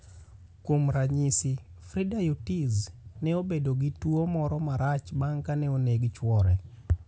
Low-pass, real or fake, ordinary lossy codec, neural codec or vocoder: none; real; none; none